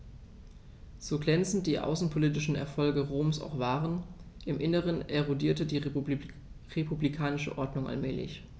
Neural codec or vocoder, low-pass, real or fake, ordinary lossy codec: none; none; real; none